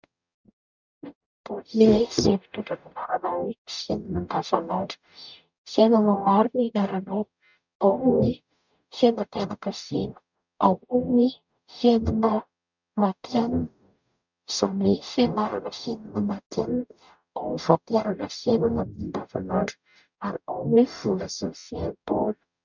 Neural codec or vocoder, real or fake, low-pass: codec, 44.1 kHz, 0.9 kbps, DAC; fake; 7.2 kHz